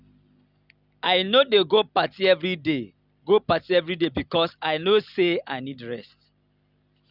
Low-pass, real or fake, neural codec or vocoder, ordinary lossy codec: 5.4 kHz; real; none; none